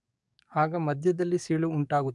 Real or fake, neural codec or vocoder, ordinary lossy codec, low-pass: fake; codec, 44.1 kHz, 7.8 kbps, DAC; MP3, 96 kbps; 10.8 kHz